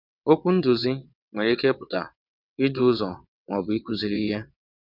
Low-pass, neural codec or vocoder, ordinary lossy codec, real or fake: 5.4 kHz; vocoder, 22.05 kHz, 80 mel bands, WaveNeXt; none; fake